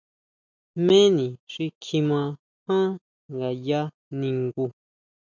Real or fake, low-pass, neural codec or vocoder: real; 7.2 kHz; none